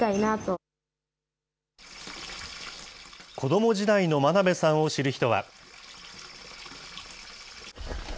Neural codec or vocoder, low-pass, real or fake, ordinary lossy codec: none; none; real; none